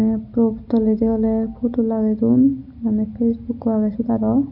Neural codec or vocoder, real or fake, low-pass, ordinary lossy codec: none; real; 5.4 kHz; none